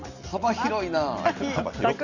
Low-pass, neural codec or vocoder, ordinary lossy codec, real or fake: 7.2 kHz; none; none; real